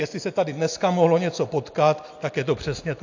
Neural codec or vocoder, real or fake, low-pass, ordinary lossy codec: none; real; 7.2 kHz; AAC, 48 kbps